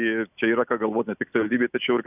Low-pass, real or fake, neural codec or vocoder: 3.6 kHz; real; none